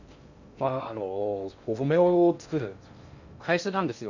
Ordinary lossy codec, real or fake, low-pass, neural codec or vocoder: none; fake; 7.2 kHz; codec, 16 kHz in and 24 kHz out, 0.6 kbps, FocalCodec, streaming, 2048 codes